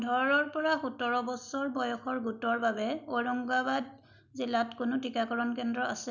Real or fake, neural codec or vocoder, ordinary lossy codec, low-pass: real; none; none; 7.2 kHz